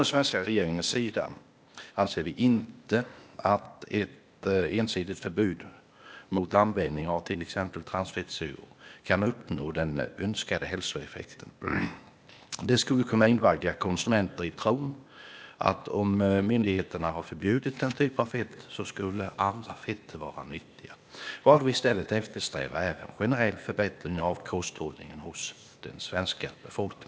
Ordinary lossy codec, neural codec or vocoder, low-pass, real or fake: none; codec, 16 kHz, 0.8 kbps, ZipCodec; none; fake